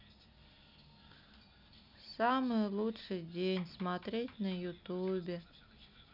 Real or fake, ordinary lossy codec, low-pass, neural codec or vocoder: real; none; 5.4 kHz; none